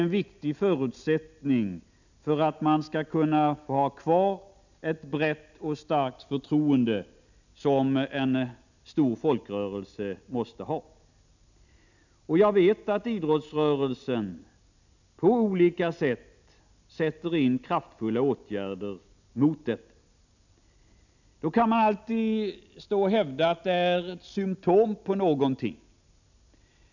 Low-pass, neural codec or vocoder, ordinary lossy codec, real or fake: 7.2 kHz; none; none; real